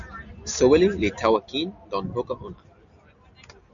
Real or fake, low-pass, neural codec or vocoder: real; 7.2 kHz; none